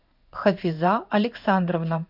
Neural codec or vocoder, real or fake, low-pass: codec, 16 kHz in and 24 kHz out, 1 kbps, XY-Tokenizer; fake; 5.4 kHz